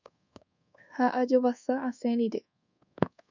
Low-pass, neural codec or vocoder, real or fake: 7.2 kHz; codec, 24 kHz, 1.2 kbps, DualCodec; fake